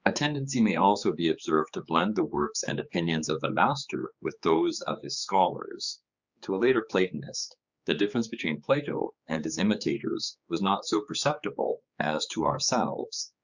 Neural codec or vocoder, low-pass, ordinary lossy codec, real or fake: codec, 16 kHz, 4 kbps, X-Codec, HuBERT features, trained on general audio; 7.2 kHz; Opus, 64 kbps; fake